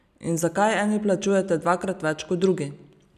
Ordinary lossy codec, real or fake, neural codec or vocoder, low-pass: none; real; none; 14.4 kHz